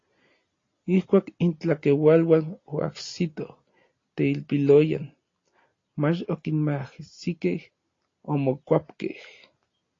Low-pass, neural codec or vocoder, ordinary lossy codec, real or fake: 7.2 kHz; none; AAC, 32 kbps; real